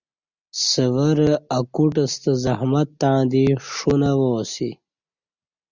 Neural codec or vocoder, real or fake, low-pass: none; real; 7.2 kHz